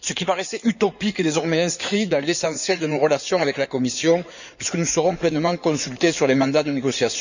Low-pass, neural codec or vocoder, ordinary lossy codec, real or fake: 7.2 kHz; codec, 16 kHz in and 24 kHz out, 2.2 kbps, FireRedTTS-2 codec; none; fake